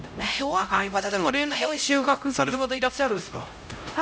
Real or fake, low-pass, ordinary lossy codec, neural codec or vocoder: fake; none; none; codec, 16 kHz, 0.5 kbps, X-Codec, HuBERT features, trained on LibriSpeech